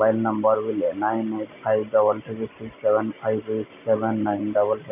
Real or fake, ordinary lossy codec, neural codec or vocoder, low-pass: real; none; none; 3.6 kHz